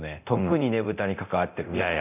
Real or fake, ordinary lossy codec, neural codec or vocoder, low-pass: fake; none; codec, 24 kHz, 0.9 kbps, DualCodec; 3.6 kHz